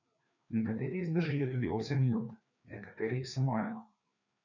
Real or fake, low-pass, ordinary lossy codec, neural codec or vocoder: fake; 7.2 kHz; none; codec, 16 kHz, 2 kbps, FreqCodec, larger model